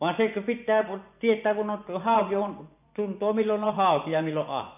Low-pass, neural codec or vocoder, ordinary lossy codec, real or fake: 3.6 kHz; vocoder, 24 kHz, 100 mel bands, Vocos; none; fake